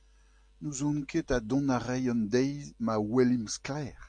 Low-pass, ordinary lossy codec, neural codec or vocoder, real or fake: 9.9 kHz; MP3, 48 kbps; none; real